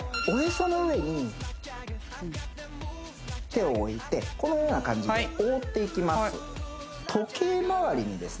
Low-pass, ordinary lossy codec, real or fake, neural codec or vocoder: none; none; real; none